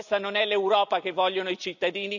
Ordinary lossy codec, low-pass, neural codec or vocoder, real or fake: none; 7.2 kHz; none; real